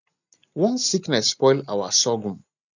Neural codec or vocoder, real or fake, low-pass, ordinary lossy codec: none; real; 7.2 kHz; none